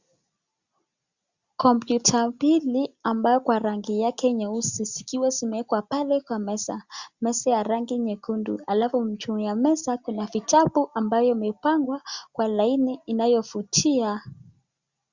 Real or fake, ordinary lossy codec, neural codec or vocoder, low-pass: real; Opus, 64 kbps; none; 7.2 kHz